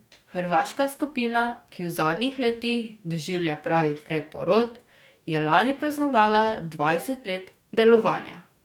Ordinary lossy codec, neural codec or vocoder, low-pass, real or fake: none; codec, 44.1 kHz, 2.6 kbps, DAC; 19.8 kHz; fake